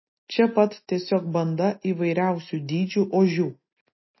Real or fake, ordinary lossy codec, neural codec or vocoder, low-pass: real; MP3, 24 kbps; none; 7.2 kHz